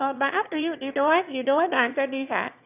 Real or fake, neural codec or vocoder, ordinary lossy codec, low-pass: fake; autoencoder, 22.05 kHz, a latent of 192 numbers a frame, VITS, trained on one speaker; none; 3.6 kHz